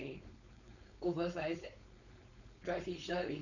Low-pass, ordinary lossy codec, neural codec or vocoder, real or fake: 7.2 kHz; none; codec, 16 kHz, 4.8 kbps, FACodec; fake